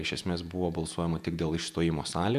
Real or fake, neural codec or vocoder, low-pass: fake; vocoder, 48 kHz, 128 mel bands, Vocos; 14.4 kHz